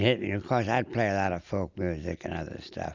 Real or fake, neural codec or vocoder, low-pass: real; none; 7.2 kHz